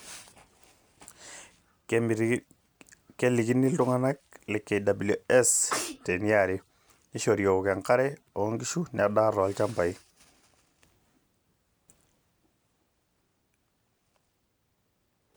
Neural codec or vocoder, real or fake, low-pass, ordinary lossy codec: none; real; none; none